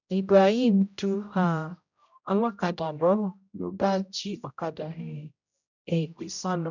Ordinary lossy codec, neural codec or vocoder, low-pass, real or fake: none; codec, 16 kHz, 0.5 kbps, X-Codec, HuBERT features, trained on general audio; 7.2 kHz; fake